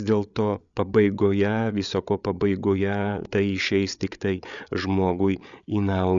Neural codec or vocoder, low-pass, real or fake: codec, 16 kHz, 8 kbps, FreqCodec, larger model; 7.2 kHz; fake